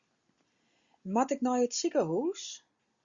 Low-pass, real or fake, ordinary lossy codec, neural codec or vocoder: 7.2 kHz; real; Opus, 64 kbps; none